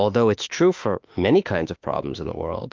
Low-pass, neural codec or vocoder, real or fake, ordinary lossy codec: 7.2 kHz; autoencoder, 48 kHz, 32 numbers a frame, DAC-VAE, trained on Japanese speech; fake; Opus, 24 kbps